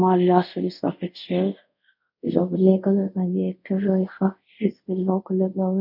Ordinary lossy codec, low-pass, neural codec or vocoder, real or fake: none; 5.4 kHz; codec, 24 kHz, 0.5 kbps, DualCodec; fake